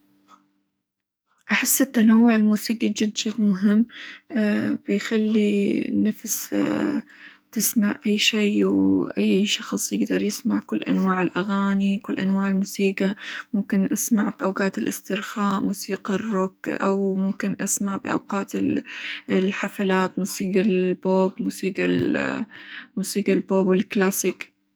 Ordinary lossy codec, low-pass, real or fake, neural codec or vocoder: none; none; fake; codec, 44.1 kHz, 2.6 kbps, SNAC